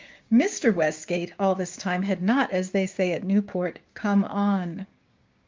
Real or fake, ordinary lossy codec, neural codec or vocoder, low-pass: fake; Opus, 32 kbps; codec, 16 kHz, 6 kbps, DAC; 7.2 kHz